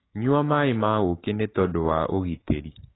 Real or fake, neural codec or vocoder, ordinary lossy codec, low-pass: fake; codec, 16 kHz, 6 kbps, DAC; AAC, 16 kbps; 7.2 kHz